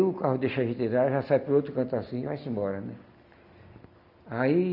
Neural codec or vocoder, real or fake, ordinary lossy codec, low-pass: none; real; none; 5.4 kHz